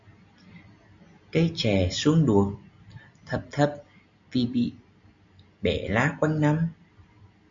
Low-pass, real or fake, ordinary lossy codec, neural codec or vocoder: 7.2 kHz; real; AAC, 64 kbps; none